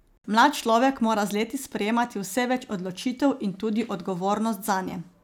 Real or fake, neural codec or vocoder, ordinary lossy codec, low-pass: real; none; none; none